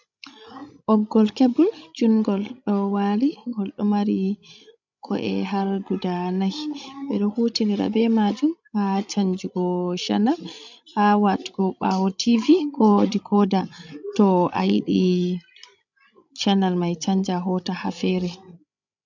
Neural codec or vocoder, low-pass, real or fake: codec, 16 kHz, 16 kbps, FreqCodec, larger model; 7.2 kHz; fake